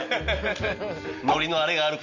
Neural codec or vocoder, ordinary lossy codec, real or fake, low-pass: none; none; real; 7.2 kHz